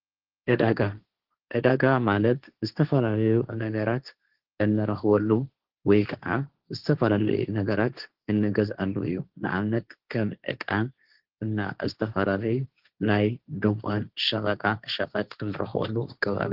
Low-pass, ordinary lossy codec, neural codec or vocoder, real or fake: 5.4 kHz; Opus, 16 kbps; codec, 16 kHz, 1.1 kbps, Voila-Tokenizer; fake